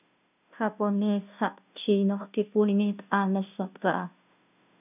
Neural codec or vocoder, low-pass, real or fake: codec, 16 kHz, 0.5 kbps, FunCodec, trained on Chinese and English, 25 frames a second; 3.6 kHz; fake